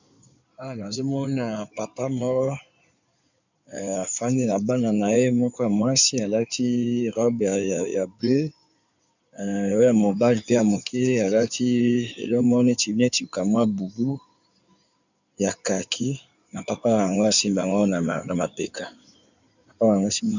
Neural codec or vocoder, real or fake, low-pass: codec, 16 kHz in and 24 kHz out, 2.2 kbps, FireRedTTS-2 codec; fake; 7.2 kHz